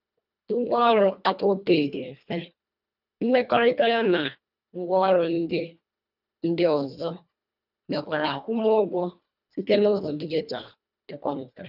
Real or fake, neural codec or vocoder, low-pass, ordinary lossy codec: fake; codec, 24 kHz, 1.5 kbps, HILCodec; 5.4 kHz; none